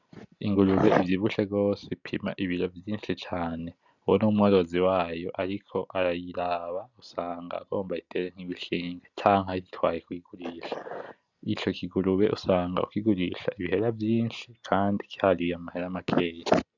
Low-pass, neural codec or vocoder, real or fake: 7.2 kHz; none; real